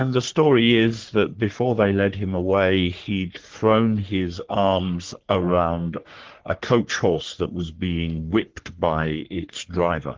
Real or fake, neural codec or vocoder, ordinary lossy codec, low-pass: fake; codec, 44.1 kHz, 3.4 kbps, Pupu-Codec; Opus, 16 kbps; 7.2 kHz